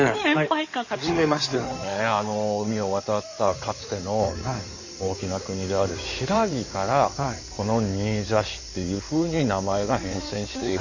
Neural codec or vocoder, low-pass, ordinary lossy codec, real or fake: codec, 16 kHz in and 24 kHz out, 2.2 kbps, FireRedTTS-2 codec; 7.2 kHz; none; fake